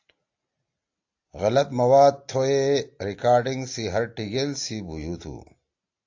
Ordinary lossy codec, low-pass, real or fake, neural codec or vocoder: AAC, 48 kbps; 7.2 kHz; real; none